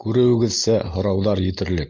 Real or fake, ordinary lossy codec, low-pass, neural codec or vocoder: real; Opus, 32 kbps; 7.2 kHz; none